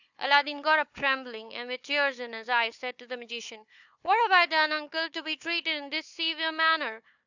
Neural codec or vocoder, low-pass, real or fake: codec, 16 kHz, 4 kbps, FunCodec, trained on Chinese and English, 50 frames a second; 7.2 kHz; fake